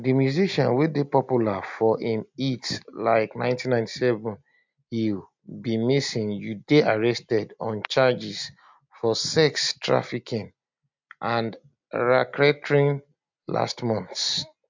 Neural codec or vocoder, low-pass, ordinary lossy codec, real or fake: none; 7.2 kHz; MP3, 64 kbps; real